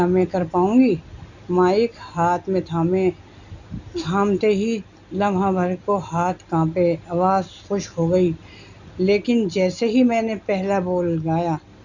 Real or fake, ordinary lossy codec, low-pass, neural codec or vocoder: real; none; 7.2 kHz; none